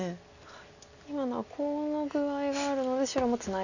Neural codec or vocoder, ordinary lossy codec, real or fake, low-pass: none; none; real; 7.2 kHz